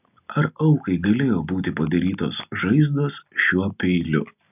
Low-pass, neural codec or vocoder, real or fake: 3.6 kHz; none; real